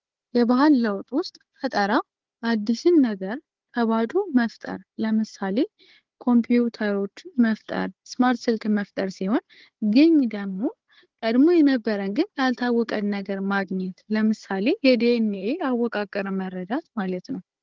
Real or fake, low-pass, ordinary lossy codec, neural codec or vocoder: fake; 7.2 kHz; Opus, 16 kbps; codec, 16 kHz, 4 kbps, FunCodec, trained on Chinese and English, 50 frames a second